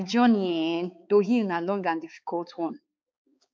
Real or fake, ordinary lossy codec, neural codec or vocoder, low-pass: fake; none; codec, 16 kHz, 4 kbps, X-Codec, HuBERT features, trained on balanced general audio; none